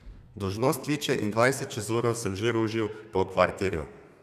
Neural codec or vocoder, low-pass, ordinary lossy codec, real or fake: codec, 32 kHz, 1.9 kbps, SNAC; 14.4 kHz; none; fake